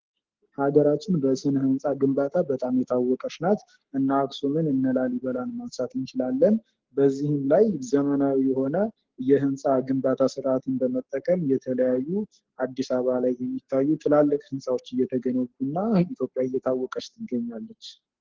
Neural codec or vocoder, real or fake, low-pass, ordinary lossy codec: codec, 44.1 kHz, 7.8 kbps, Pupu-Codec; fake; 7.2 kHz; Opus, 16 kbps